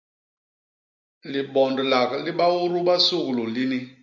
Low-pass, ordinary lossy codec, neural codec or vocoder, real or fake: 7.2 kHz; MP3, 64 kbps; none; real